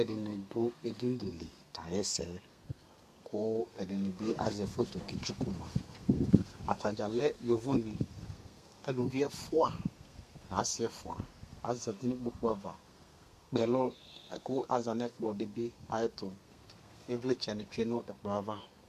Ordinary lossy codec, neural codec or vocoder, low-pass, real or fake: MP3, 64 kbps; codec, 32 kHz, 1.9 kbps, SNAC; 14.4 kHz; fake